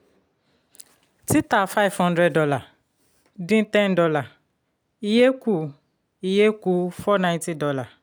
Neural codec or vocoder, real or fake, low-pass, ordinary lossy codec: none; real; none; none